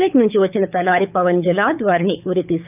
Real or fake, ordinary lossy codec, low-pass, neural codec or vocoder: fake; none; 3.6 kHz; codec, 24 kHz, 6 kbps, HILCodec